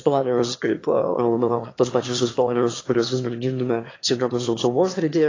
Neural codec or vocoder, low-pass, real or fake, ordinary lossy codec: autoencoder, 22.05 kHz, a latent of 192 numbers a frame, VITS, trained on one speaker; 7.2 kHz; fake; AAC, 32 kbps